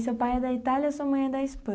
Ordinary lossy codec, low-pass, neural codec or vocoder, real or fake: none; none; none; real